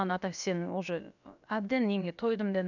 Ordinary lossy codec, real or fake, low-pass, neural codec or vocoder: none; fake; 7.2 kHz; codec, 16 kHz, about 1 kbps, DyCAST, with the encoder's durations